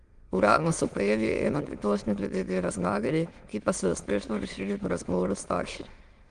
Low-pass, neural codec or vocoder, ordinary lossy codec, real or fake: 9.9 kHz; autoencoder, 22.05 kHz, a latent of 192 numbers a frame, VITS, trained on many speakers; Opus, 24 kbps; fake